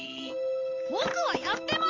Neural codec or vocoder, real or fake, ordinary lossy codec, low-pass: none; real; Opus, 32 kbps; 7.2 kHz